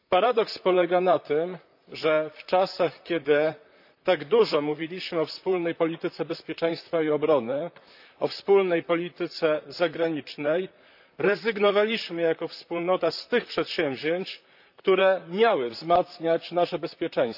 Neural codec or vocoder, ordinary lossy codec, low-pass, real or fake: vocoder, 44.1 kHz, 128 mel bands, Pupu-Vocoder; none; 5.4 kHz; fake